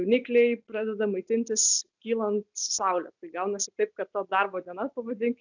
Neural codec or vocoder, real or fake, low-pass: none; real; 7.2 kHz